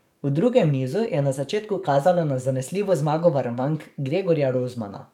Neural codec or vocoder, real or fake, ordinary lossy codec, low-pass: codec, 44.1 kHz, 7.8 kbps, DAC; fake; none; 19.8 kHz